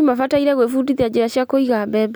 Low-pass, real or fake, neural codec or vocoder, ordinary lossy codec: none; real; none; none